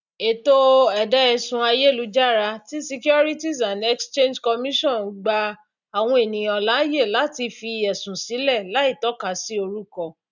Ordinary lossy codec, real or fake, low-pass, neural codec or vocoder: none; real; 7.2 kHz; none